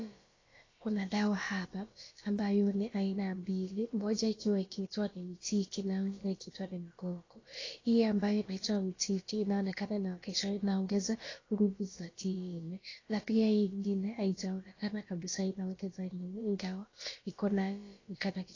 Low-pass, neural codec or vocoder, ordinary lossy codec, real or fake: 7.2 kHz; codec, 16 kHz, about 1 kbps, DyCAST, with the encoder's durations; AAC, 32 kbps; fake